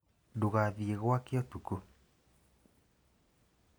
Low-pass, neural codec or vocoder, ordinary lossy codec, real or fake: none; none; none; real